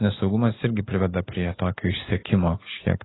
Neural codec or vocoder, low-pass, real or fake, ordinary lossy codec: none; 7.2 kHz; real; AAC, 16 kbps